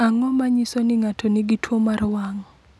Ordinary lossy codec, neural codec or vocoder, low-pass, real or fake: none; none; none; real